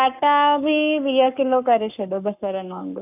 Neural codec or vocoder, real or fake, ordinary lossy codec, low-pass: codec, 44.1 kHz, 7.8 kbps, Pupu-Codec; fake; none; 3.6 kHz